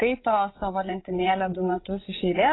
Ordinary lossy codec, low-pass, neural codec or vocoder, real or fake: AAC, 16 kbps; 7.2 kHz; codec, 16 kHz, 8 kbps, FreqCodec, larger model; fake